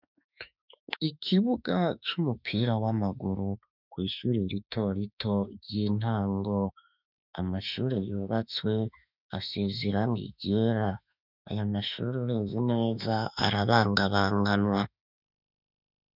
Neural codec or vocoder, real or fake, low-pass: autoencoder, 48 kHz, 32 numbers a frame, DAC-VAE, trained on Japanese speech; fake; 5.4 kHz